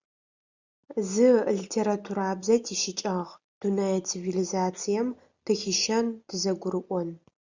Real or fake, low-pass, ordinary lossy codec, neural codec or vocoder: real; 7.2 kHz; Opus, 64 kbps; none